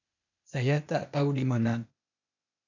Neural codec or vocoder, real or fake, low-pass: codec, 16 kHz, 0.8 kbps, ZipCodec; fake; 7.2 kHz